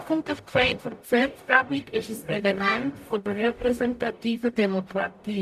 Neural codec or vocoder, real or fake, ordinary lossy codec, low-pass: codec, 44.1 kHz, 0.9 kbps, DAC; fake; none; 14.4 kHz